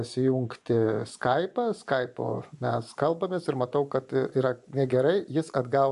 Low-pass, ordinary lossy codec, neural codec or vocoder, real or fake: 10.8 kHz; AAC, 96 kbps; vocoder, 24 kHz, 100 mel bands, Vocos; fake